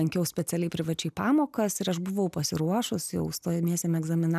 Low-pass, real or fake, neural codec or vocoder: 14.4 kHz; real; none